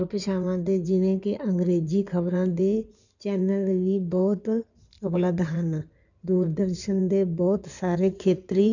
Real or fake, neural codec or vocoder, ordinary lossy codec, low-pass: fake; codec, 16 kHz in and 24 kHz out, 2.2 kbps, FireRedTTS-2 codec; none; 7.2 kHz